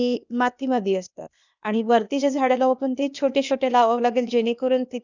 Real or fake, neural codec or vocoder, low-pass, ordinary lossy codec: fake; codec, 16 kHz, 0.8 kbps, ZipCodec; 7.2 kHz; none